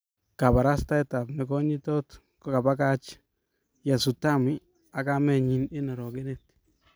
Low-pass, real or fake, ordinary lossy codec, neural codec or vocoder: none; real; none; none